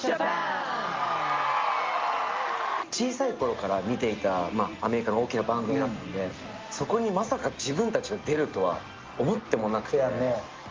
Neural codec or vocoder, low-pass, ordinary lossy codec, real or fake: none; 7.2 kHz; Opus, 32 kbps; real